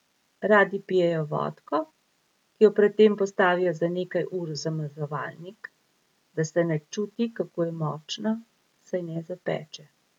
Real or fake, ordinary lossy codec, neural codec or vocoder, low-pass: real; none; none; 19.8 kHz